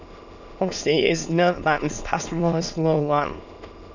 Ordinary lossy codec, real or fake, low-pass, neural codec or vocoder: none; fake; 7.2 kHz; autoencoder, 22.05 kHz, a latent of 192 numbers a frame, VITS, trained on many speakers